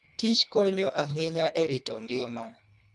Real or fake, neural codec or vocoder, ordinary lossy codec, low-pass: fake; codec, 24 kHz, 1.5 kbps, HILCodec; none; none